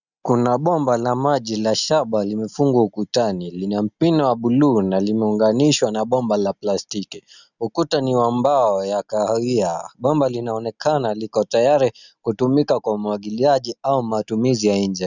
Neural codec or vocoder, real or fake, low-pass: none; real; 7.2 kHz